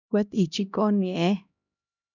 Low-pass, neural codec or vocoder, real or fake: 7.2 kHz; codec, 16 kHz, 1 kbps, X-Codec, HuBERT features, trained on LibriSpeech; fake